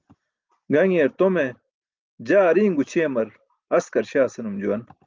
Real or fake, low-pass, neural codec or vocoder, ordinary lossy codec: real; 7.2 kHz; none; Opus, 24 kbps